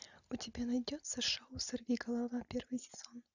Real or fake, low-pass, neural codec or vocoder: real; 7.2 kHz; none